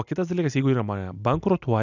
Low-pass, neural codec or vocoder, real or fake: 7.2 kHz; none; real